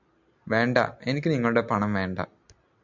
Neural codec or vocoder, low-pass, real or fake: none; 7.2 kHz; real